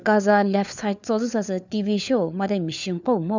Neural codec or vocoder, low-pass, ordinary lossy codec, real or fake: codec, 16 kHz, 4 kbps, FunCodec, trained on LibriTTS, 50 frames a second; 7.2 kHz; none; fake